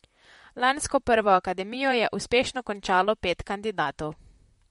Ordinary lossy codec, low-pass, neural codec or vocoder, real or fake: MP3, 48 kbps; 19.8 kHz; vocoder, 44.1 kHz, 128 mel bands, Pupu-Vocoder; fake